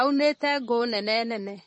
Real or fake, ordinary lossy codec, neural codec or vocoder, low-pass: fake; MP3, 32 kbps; vocoder, 44.1 kHz, 128 mel bands, Pupu-Vocoder; 10.8 kHz